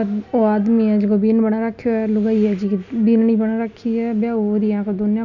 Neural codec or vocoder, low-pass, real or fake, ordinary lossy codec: none; 7.2 kHz; real; none